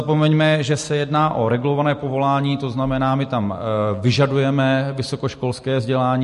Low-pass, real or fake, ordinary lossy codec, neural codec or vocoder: 14.4 kHz; real; MP3, 48 kbps; none